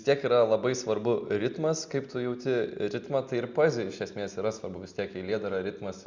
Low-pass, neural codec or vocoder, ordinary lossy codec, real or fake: 7.2 kHz; none; Opus, 64 kbps; real